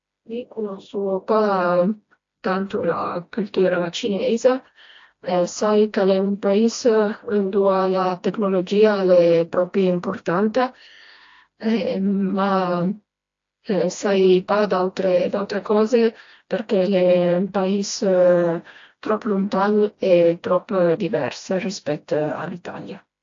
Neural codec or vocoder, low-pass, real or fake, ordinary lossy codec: codec, 16 kHz, 1 kbps, FreqCodec, smaller model; 7.2 kHz; fake; AAC, 48 kbps